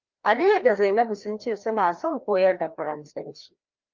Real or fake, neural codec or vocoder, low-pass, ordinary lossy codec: fake; codec, 16 kHz, 1 kbps, FreqCodec, larger model; 7.2 kHz; Opus, 24 kbps